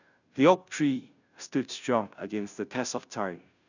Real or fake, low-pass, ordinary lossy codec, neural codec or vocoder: fake; 7.2 kHz; none; codec, 16 kHz, 0.5 kbps, FunCodec, trained on Chinese and English, 25 frames a second